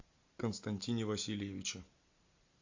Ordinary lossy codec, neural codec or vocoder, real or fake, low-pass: AAC, 48 kbps; none; real; 7.2 kHz